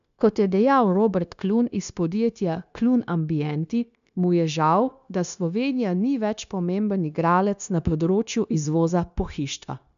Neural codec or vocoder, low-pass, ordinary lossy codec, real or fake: codec, 16 kHz, 0.9 kbps, LongCat-Audio-Codec; 7.2 kHz; MP3, 96 kbps; fake